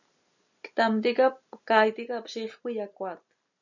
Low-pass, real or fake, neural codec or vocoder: 7.2 kHz; real; none